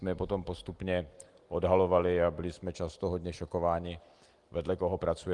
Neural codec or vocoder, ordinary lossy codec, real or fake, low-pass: none; Opus, 24 kbps; real; 10.8 kHz